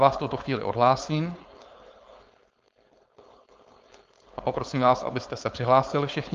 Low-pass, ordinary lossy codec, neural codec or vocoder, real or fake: 7.2 kHz; Opus, 32 kbps; codec, 16 kHz, 4.8 kbps, FACodec; fake